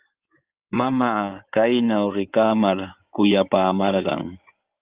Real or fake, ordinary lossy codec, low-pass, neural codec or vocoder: fake; Opus, 32 kbps; 3.6 kHz; codec, 16 kHz in and 24 kHz out, 2.2 kbps, FireRedTTS-2 codec